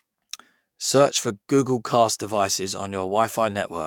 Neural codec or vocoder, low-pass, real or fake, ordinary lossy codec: codec, 44.1 kHz, 7.8 kbps, DAC; 19.8 kHz; fake; none